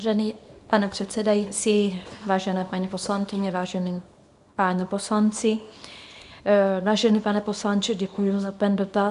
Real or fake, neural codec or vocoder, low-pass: fake; codec, 24 kHz, 0.9 kbps, WavTokenizer, small release; 10.8 kHz